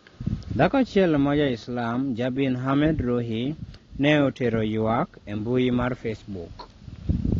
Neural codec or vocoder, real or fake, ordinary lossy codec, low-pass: none; real; AAC, 32 kbps; 7.2 kHz